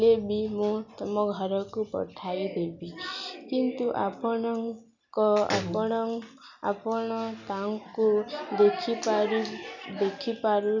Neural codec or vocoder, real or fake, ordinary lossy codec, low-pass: none; real; none; 7.2 kHz